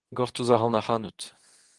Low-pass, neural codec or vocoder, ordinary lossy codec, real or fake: 10.8 kHz; codec, 24 kHz, 0.9 kbps, WavTokenizer, medium speech release version 2; Opus, 24 kbps; fake